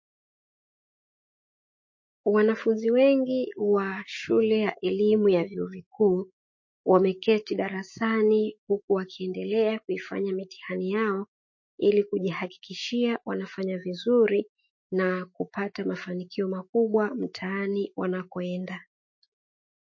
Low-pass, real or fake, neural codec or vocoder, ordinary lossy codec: 7.2 kHz; fake; autoencoder, 48 kHz, 128 numbers a frame, DAC-VAE, trained on Japanese speech; MP3, 32 kbps